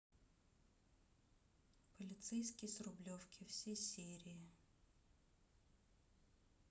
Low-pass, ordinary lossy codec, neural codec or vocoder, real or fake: none; none; none; real